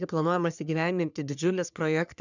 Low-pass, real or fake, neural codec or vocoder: 7.2 kHz; fake; codec, 44.1 kHz, 3.4 kbps, Pupu-Codec